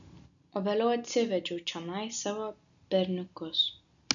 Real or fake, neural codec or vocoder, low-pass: real; none; 7.2 kHz